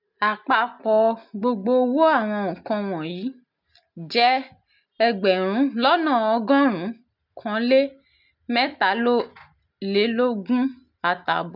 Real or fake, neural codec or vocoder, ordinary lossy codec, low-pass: real; none; none; 5.4 kHz